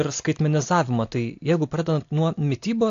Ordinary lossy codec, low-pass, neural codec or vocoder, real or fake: AAC, 48 kbps; 7.2 kHz; none; real